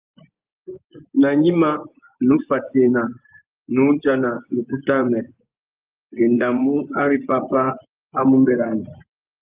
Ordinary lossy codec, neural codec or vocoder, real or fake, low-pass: Opus, 32 kbps; vocoder, 24 kHz, 100 mel bands, Vocos; fake; 3.6 kHz